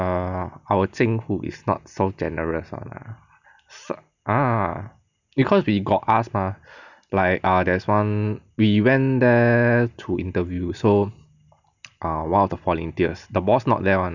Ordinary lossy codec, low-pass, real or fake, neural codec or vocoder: none; 7.2 kHz; real; none